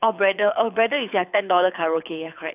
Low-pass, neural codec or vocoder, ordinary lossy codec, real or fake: 3.6 kHz; codec, 24 kHz, 6 kbps, HILCodec; none; fake